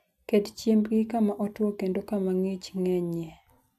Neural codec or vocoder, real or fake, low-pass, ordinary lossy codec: none; real; 14.4 kHz; none